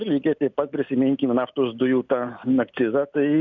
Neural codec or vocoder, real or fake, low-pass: none; real; 7.2 kHz